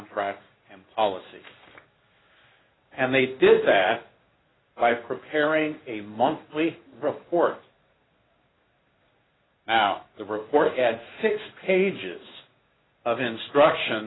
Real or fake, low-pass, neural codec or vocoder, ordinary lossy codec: fake; 7.2 kHz; codec, 16 kHz in and 24 kHz out, 1 kbps, XY-Tokenizer; AAC, 16 kbps